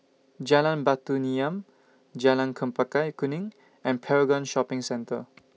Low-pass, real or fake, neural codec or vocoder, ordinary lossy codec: none; real; none; none